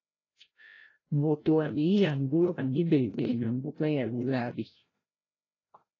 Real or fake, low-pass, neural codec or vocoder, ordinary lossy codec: fake; 7.2 kHz; codec, 16 kHz, 0.5 kbps, FreqCodec, larger model; AAC, 32 kbps